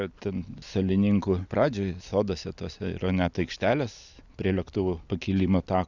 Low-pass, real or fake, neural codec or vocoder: 7.2 kHz; real; none